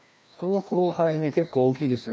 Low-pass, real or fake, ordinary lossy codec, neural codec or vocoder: none; fake; none; codec, 16 kHz, 1 kbps, FreqCodec, larger model